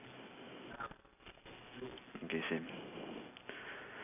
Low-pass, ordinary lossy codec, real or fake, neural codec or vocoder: 3.6 kHz; none; real; none